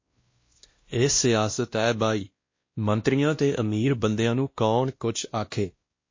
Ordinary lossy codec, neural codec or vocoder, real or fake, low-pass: MP3, 32 kbps; codec, 16 kHz, 1 kbps, X-Codec, WavLM features, trained on Multilingual LibriSpeech; fake; 7.2 kHz